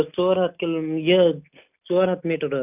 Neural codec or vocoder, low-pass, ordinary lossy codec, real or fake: none; 3.6 kHz; none; real